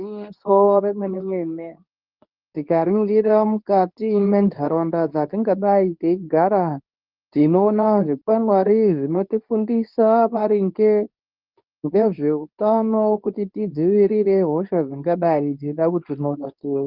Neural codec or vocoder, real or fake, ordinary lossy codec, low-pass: codec, 24 kHz, 0.9 kbps, WavTokenizer, medium speech release version 2; fake; Opus, 32 kbps; 5.4 kHz